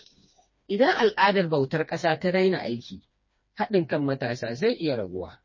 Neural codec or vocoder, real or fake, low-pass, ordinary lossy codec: codec, 16 kHz, 2 kbps, FreqCodec, smaller model; fake; 7.2 kHz; MP3, 32 kbps